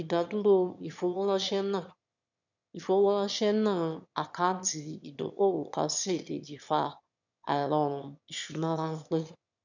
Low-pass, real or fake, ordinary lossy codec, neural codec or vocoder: 7.2 kHz; fake; none; autoencoder, 22.05 kHz, a latent of 192 numbers a frame, VITS, trained on one speaker